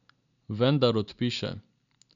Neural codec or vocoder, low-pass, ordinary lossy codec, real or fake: none; 7.2 kHz; none; real